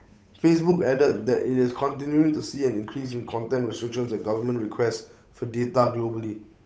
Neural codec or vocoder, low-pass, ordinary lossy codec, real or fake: codec, 16 kHz, 8 kbps, FunCodec, trained on Chinese and English, 25 frames a second; none; none; fake